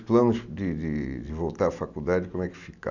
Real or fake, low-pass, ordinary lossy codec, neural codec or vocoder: real; 7.2 kHz; none; none